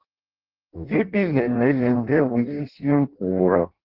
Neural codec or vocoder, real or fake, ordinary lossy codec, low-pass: codec, 16 kHz in and 24 kHz out, 0.6 kbps, FireRedTTS-2 codec; fake; Opus, 16 kbps; 5.4 kHz